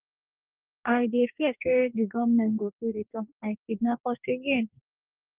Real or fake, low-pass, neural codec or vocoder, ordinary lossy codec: fake; 3.6 kHz; codec, 44.1 kHz, 2.6 kbps, DAC; Opus, 32 kbps